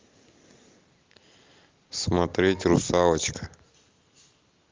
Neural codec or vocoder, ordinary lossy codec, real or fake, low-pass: none; Opus, 24 kbps; real; 7.2 kHz